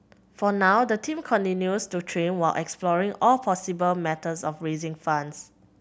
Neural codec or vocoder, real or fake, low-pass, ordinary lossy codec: none; real; none; none